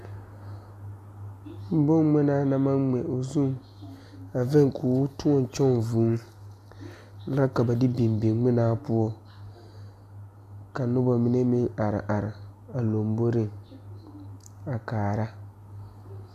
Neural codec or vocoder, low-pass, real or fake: none; 14.4 kHz; real